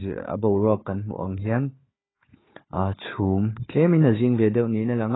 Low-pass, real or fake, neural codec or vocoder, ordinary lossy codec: 7.2 kHz; fake; codec, 16 kHz, 4 kbps, FunCodec, trained on Chinese and English, 50 frames a second; AAC, 16 kbps